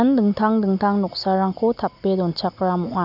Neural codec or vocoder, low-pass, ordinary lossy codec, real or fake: none; 5.4 kHz; none; real